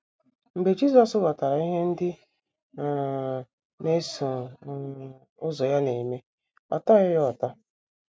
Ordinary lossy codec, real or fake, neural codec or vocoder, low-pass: none; real; none; none